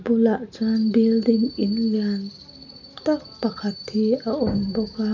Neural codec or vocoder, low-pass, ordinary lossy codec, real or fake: none; 7.2 kHz; none; real